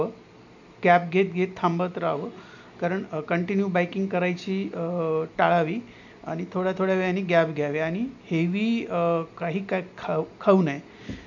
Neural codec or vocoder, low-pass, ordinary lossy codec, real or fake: none; 7.2 kHz; none; real